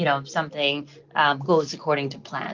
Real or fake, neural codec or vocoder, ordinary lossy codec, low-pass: real; none; Opus, 24 kbps; 7.2 kHz